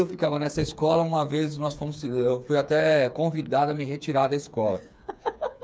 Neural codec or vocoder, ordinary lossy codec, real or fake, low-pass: codec, 16 kHz, 4 kbps, FreqCodec, smaller model; none; fake; none